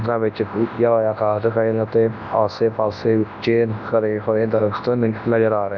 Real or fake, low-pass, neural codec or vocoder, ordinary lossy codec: fake; 7.2 kHz; codec, 24 kHz, 0.9 kbps, WavTokenizer, large speech release; none